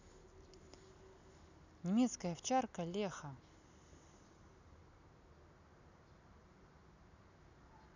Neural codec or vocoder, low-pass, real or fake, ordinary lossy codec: none; 7.2 kHz; real; none